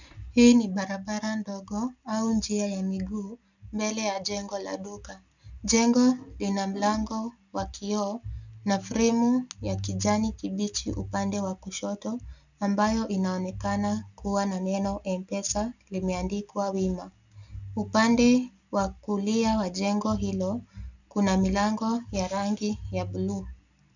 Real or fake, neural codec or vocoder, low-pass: real; none; 7.2 kHz